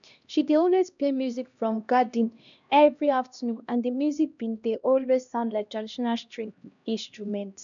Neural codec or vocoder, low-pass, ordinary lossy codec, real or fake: codec, 16 kHz, 1 kbps, X-Codec, HuBERT features, trained on LibriSpeech; 7.2 kHz; none; fake